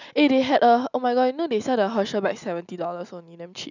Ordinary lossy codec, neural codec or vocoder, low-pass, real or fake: none; none; 7.2 kHz; real